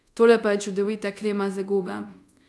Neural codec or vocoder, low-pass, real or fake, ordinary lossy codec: codec, 24 kHz, 0.5 kbps, DualCodec; none; fake; none